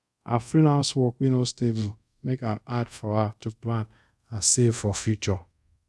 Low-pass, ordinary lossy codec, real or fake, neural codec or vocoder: none; none; fake; codec, 24 kHz, 0.5 kbps, DualCodec